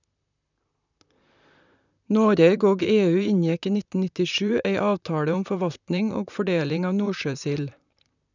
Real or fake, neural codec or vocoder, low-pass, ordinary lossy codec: fake; vocoder, 44.1 kHz, 128 mel bands, Pupu-Vocoder; 7.2 kHz; none